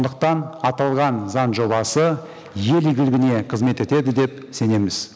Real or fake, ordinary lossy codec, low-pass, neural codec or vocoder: real; none; none; none